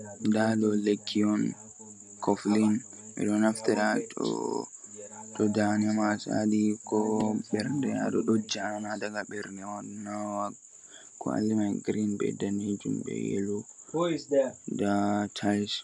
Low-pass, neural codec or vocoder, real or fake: 10.8 kHz; none; real